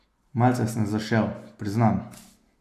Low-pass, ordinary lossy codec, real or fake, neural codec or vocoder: 14.4 kHz; AAC, 64 kbps; real; none